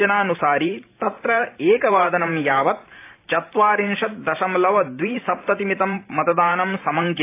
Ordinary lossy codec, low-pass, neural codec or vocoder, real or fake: AAC, 24 kbps; 3.6 kHz; vocoder, 44.1 kHz, 128 mel bands every 512 samples, BigVGAN v2; fake